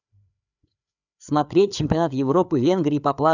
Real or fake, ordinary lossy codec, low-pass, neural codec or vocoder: fake; none; 7.2 kHz; codec, 16 kHz, 4 kbps, FreqCodec, larger model